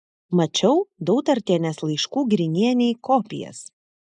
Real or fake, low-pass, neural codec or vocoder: real; 10.8 kHz; none